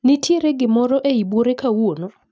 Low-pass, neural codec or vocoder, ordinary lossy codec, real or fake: none; none; none; real